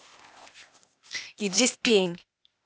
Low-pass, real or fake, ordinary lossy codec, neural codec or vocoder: none; fake; none; codec, 16 kHz, 0.8 kbps, ZipCodec